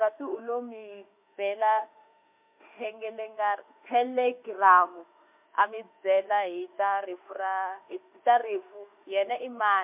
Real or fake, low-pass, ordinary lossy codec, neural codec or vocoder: fake; 3.6 kHz; MP3, 32 kbps; autoencoder, 48 kHz, 32 numbers a frame, DAC-VAE, trained on Japanese speech